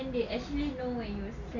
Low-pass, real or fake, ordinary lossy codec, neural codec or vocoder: 7.2 kHz; real; none; none